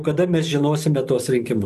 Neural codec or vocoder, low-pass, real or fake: vocoder, 44.1 kHz, 128 mel bands every 512 samples, BigVGAN v2; 14.4 kHz; fake